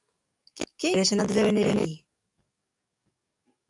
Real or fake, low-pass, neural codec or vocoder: fake; 10.8 kHz; codec, 44.1 kHz, 7.8 kbps, DAC